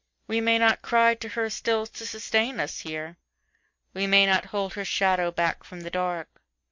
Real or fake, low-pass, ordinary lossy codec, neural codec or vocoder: real; 7.2 kHz; MP3, 48 kbps; none